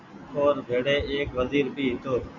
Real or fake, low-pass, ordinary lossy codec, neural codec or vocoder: real; 7.2 kHz; Opus, 64 kbps; none